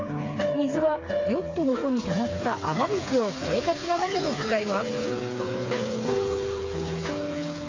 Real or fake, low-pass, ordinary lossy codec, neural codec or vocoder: fake; 7.2 kHz; AAC, 32 kbps; codec, 16 kHz, 4 kbps, FreqCodec, smaller model